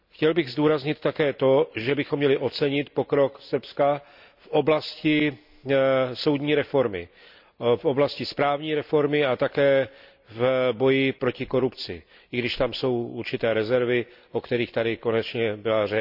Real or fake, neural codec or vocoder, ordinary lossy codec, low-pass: real; none; none; 5.4 kHz